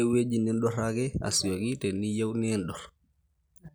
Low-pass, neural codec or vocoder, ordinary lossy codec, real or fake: none; none; none; real